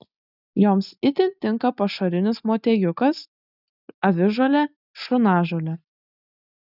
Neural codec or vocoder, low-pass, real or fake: none; 5.4 kHz; real